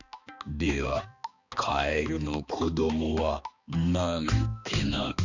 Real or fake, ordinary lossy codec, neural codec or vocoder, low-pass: fake; none; codec, 16 kHz, 2 kbps, X-Codec, HuBERT features, trained on balanced general audio; 7.2 kHz